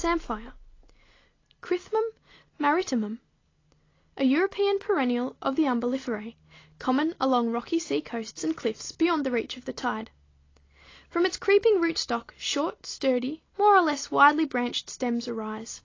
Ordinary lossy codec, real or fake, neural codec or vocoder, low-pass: AAC, 32 kbps; real; none; 7.2 kHz